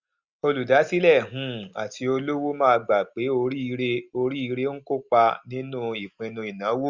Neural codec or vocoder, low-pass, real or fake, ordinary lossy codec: none; 7.2 kHz; real; Opus, 64 kbps